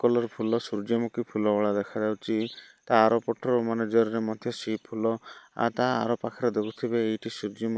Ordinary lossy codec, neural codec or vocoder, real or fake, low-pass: none; none; real; none